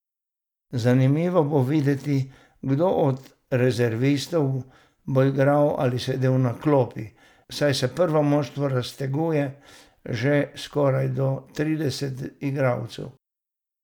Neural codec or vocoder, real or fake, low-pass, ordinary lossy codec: none; real; 19.8 kHz; none